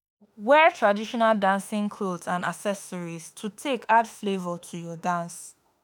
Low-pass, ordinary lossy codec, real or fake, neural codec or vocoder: none; none; fake; autoencoder, 48 kHz, 32 numbers a frame, DAC-VAE, trained on Japanese speech